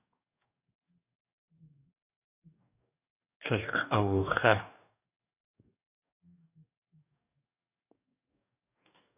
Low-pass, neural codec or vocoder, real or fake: 3.6 kHz; codec, 44.1 kHz, 2.6 kbps, DAC; fake